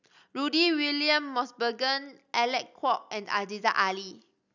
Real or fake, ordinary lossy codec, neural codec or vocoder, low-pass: real; none; none; 7.2 kHz